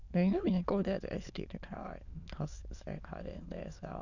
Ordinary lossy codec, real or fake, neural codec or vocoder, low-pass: none; fake; autoencoder, 22.05 kHz, a latent of 192 numbers a frame, VITS, trained on many speakers; 7.2 kHz